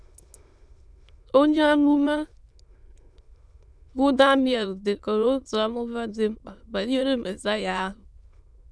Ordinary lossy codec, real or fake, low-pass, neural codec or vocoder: none; fake; none; autoencoder, 22.05 kHz, a latent of 192 numbers a frame, VITS, trained on many speakers